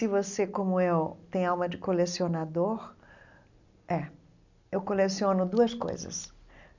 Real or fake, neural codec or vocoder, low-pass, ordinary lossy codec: real; none; 7.2 kHz; none